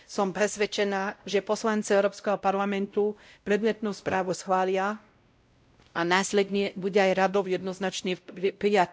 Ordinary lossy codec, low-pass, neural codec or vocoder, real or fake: none; none; codec, 16 kHz, 0.5 kbps, X-Codec, WavLM features, trained on Multilingual LibriSpeech; fake